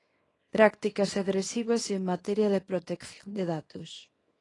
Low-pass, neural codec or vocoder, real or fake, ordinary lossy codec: 10.8 kHz; codec, 24 kHz, 0.9 kbps, WavTokenizer, small release; fake; AAC, 32 kbps